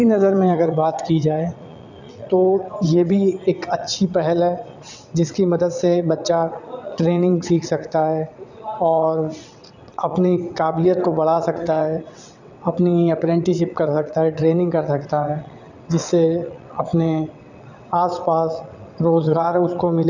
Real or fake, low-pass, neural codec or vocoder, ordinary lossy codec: fake; 7.2 kHz; vocoder, 22.05 kHz, 80 mel bands, WaveNeXt; none